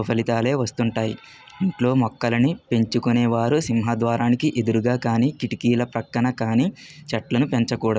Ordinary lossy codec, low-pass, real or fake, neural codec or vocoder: none; none; real; none